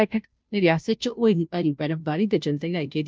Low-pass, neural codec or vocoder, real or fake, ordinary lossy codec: none; codec, 16 kHz, 0.5 kbps, FunCodec, trained on Chinese and English, 25 frames a second; fake; none